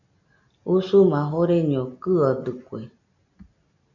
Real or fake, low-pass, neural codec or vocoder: real; 7.2 kHz; none